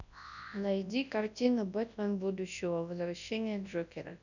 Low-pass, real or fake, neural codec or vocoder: 7.2 kHz; fake; codec, 24 kHz, 0.9 kbps, WavTokenizer, large speech release